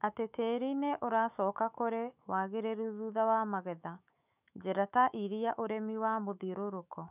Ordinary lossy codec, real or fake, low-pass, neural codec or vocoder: none; fake; 3.6 kHz; autoencoder, 48 kHz, 128 numbers a frame, DAC-VAE, trained on Japanese speech